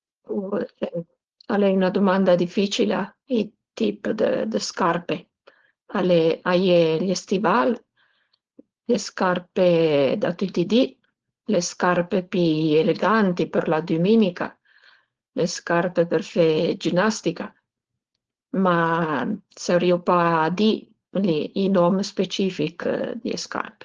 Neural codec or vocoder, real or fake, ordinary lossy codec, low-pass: codec, 16 kHz, 4.8 kbps, FACodec; fake; Opus, 16 kbps; 7.2 kHz